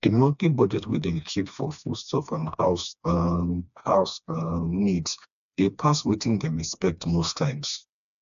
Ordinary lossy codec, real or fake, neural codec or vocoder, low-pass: AAC, 96 kbps; fake; codec, 16 kHz, 2 kbps, FreqCodec, smaller model; 7.2 kHz